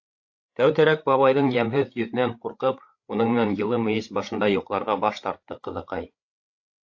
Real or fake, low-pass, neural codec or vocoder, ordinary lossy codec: fake; 7.2 kHz; codec, 16 kHz, 8 kbps, FreqCodec, larger model; AAC, 48 kbps